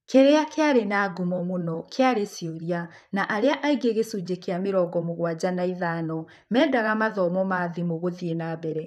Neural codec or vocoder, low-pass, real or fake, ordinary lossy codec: vocoder, 44.1 kHz, 128 mel bands, Pupu-Vocoder; 14.4 kHz; fake; none